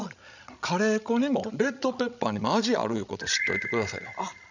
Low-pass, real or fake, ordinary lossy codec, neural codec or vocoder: 7.2 kHz; fake; none; codec, 16 kHz, 16 kbps, FreqCodec, larger model